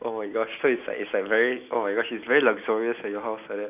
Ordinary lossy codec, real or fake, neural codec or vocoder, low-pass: none; real; none; 3.6 kHz